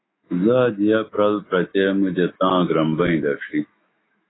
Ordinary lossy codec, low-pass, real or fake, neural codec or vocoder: AAC, 16 kbps; 7.2 kHz; fake; autoencoder, 48 kHz, 128 numbers a frame, DAC-VAE, trained on Japanese speech